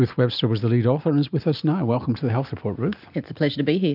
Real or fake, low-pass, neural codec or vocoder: real; 5.4 kHz; none